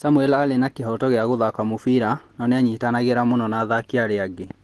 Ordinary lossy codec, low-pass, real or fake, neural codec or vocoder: Opus, 16 kbps; 10.8 kHz; real; none